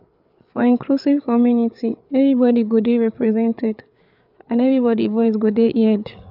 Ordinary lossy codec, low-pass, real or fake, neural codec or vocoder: none; 5.4 kHz; fake; codec, 16 kHz, 8 kbps, FreqCodec, larger model